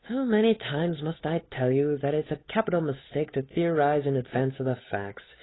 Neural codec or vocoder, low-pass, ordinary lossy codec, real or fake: codec, 16 kHz, 8 kbps, FunCodec, trained on Chinese and English, 25 frames a second; 7.2 kHz; AAC, 16 kbps; fake